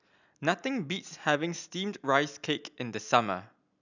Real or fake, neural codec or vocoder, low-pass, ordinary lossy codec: real; none; 7.2 kHz; none